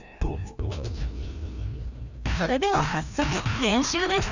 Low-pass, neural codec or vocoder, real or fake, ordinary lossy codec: 7.2 kHz; codec, 16 kHz, 1 kbps, FreqCodec, larger model; fake; none